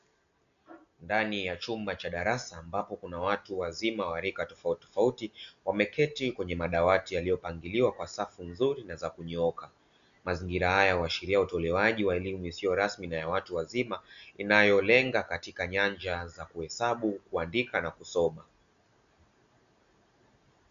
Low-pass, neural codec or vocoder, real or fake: 7.2 kHz; none; real